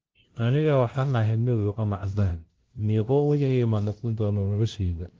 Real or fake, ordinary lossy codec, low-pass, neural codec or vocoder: fake; Opus, 16 kbps; 7.2 kHz; codec, 16 kHz, 0.5 kbps, FunCodec, trained on LibriTTS, 25 frames a second